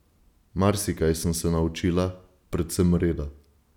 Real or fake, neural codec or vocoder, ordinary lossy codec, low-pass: real; none; none; 19.8 kHz